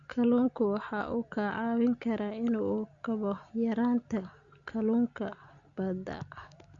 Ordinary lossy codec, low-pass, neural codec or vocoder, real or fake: Opus, 64 kbps; 7.2 kHz; none; real